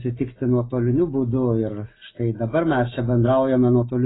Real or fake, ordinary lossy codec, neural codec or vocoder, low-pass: real; AAC, 16 kbps; none; 7.2 kHz